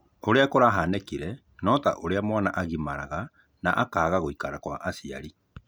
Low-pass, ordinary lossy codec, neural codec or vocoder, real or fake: none; none; none; real